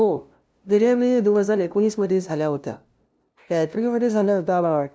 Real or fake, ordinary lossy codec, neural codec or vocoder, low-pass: fake; none; codec, 16 kHz, 0.5 kbps, FunCodec, trained on LibriTTS, 25 frames a second; none